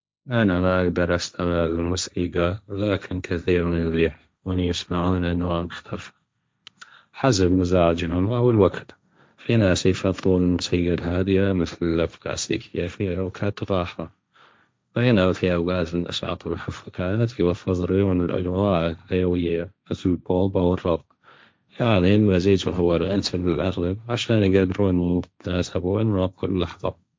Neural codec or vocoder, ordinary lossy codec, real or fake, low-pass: codec, 16 kHz, 1.1 kbps, Voila-Tokenizer; none; fake; none